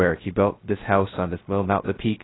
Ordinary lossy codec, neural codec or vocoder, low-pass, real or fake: AAC, 16 kbps; codec, 16 kHz, 0.2 kbps, FocalCodec; 7.2 kHz; fake